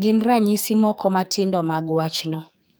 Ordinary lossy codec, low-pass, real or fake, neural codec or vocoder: none; none; fake; codec, 44.1 kHz, 2.6 kbps, SNAC